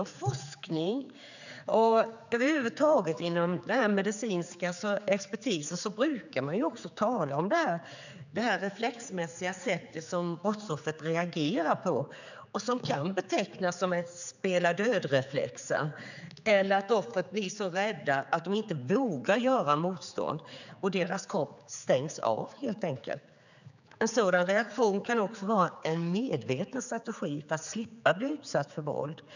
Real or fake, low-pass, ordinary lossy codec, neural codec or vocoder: fake; 7.2 kHz; none; codec, 16 kHz, 4 kbps, X-Codec, HuBERT features, trained on general audio